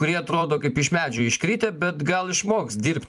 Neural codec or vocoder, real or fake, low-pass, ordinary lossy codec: vocoder, 44.1 kHz, 128 mel bands every 256 samples, BigVGAN v2; fake; 10.8 kHz; MP3, 96 kbps